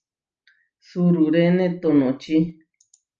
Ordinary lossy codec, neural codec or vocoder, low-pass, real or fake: Opus, 24 kbps; none; 7.2 kHz; real